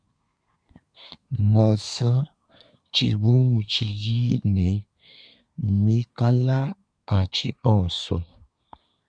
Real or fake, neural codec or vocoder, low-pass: fake; codec, 24 kHz, 1 kbps, SNAC; 9.9 kHz